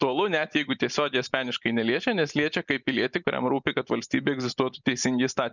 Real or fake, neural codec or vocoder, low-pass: real; none; 7.2 kHz